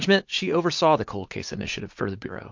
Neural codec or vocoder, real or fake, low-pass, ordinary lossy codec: vocoder, 44.1 kHz, 80 mel bands, Vocos; fake; 7.2 kHz; MP3, 48 kbps